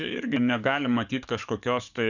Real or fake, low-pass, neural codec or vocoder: fake; 7.2 kHz; codec, 44.1 kHz, 7.8 kbps, DAC